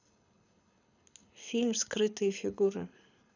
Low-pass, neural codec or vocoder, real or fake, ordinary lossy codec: 7.2 kHz; codec, 24 kHz, 6 kbps, HILCodec; fake; none